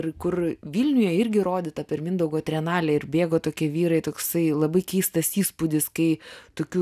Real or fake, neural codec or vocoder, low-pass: real; none; 14.4 kHz